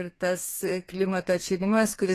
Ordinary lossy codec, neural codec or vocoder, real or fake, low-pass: AAC, 48 kbps; codec, 32 kHz, 1.9 kbps, SNAC; fake; 14.4 kHz